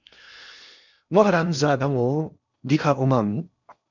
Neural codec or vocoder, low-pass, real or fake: codec, 16 kHz in and 24 kHz out, 0.8 kbps, FocalCodec, streaming, 65536 codes; 7.2 kHz; fake